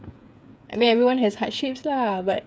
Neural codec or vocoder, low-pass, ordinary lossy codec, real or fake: codec, 16 kHz, 8 kbps, FreqCodec, smaller model; none; none; fake